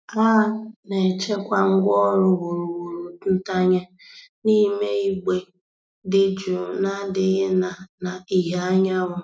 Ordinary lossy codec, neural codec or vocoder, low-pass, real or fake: none; none; none; real